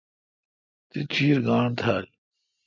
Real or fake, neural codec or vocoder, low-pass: real; none; 7.2 kHz